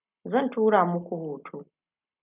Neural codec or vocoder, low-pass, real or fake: none; 3.6 kHz; real